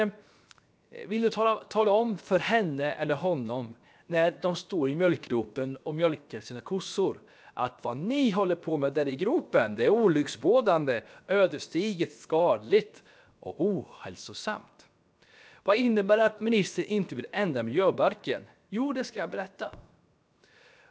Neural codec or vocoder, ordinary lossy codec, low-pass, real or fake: codec, 16 kHz, 0.7 kbps, FocalCodec; none; none; fake